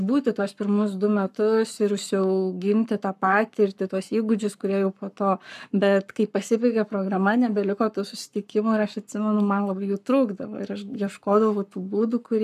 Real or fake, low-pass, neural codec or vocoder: fake; 14.4 kHz; codec, 44.1 kHz, 7.8 kbps, Pupu-Codec